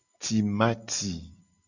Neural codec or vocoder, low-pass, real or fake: none; 7.2 kHz; real